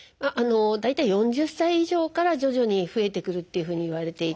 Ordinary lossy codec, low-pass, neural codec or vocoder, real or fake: none; none; none; real